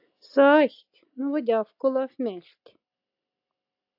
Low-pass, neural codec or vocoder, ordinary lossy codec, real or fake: 5.4 kHz; none; AAC, 48 kbps; real